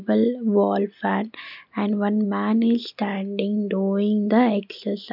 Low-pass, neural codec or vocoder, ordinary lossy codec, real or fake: 5.4 kHz; none; none; real